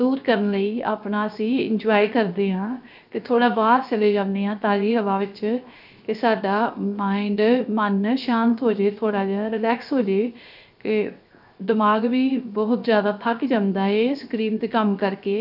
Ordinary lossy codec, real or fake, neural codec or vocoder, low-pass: none; fake; codec, 16 kHz, 0.7 kbps, FocalCodec; 5.4 kHz